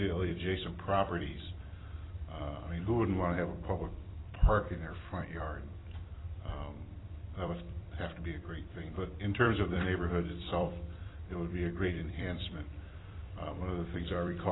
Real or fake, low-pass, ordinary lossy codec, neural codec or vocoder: fake; 7.2 kHz; AAC, 16 kbps; vocoder, 44.1 kHz, 128 mel bands every 256 samples, BigVGAN v2